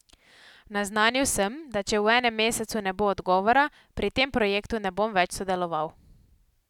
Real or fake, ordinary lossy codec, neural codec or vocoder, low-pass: real; none; none; 19.8 kHz